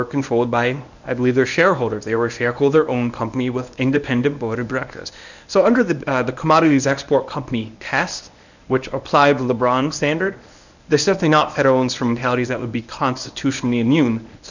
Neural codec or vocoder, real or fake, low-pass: codec, 24 kHz, 0.9 kbps, WavTokenizer, medium speech release version 1; fake; 7.2 kHz